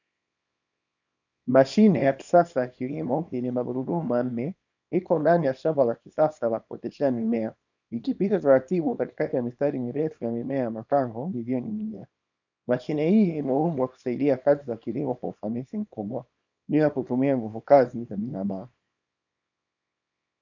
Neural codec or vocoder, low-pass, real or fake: codec, 24 kHz, 0.9 kbps, WavTokenizer, small release; 7.2 kHz; fake